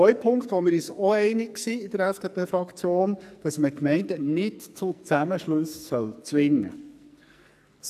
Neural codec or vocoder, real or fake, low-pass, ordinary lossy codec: codec, 44.1 kHz, 2.6 kbps, SNAC; fake; 14.4 kHz; AAC, 96 kbps